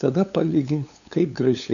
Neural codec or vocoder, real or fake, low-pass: codec, 16 kHz, 8 kbps, FunCodec, trained on LibriTTS, 25 frames a second; fake; 7.2 kHz